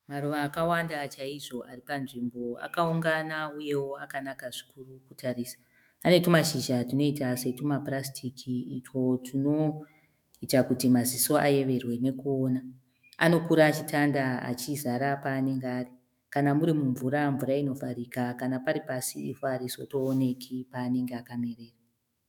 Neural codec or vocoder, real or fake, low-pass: autoencoder, 48 kHz, 128 numbers a frame, DAC-VAE, trained on Japanese speech; fake; 19.8 kHz